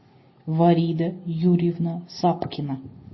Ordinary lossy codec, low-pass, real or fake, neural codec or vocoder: MP3, 24 kbps; 7.2 kHz; real; none